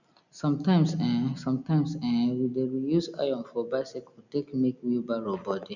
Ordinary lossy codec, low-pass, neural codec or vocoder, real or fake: none; 7.2 kHz; none; real